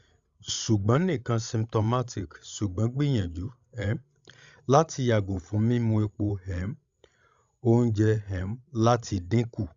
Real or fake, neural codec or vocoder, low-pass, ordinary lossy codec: fake; codec, 16 kHz, 16 kbps, FreqCodec, larger model; 7.2 kHz; Opus, 64 kbps